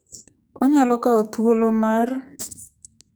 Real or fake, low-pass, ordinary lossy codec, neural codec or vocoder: fake; none; none; codec, 44.1 kHz, 2.6 kbps, SNAC